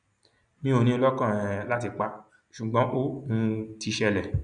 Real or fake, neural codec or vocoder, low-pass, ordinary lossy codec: real; none; 9.9 kHz; none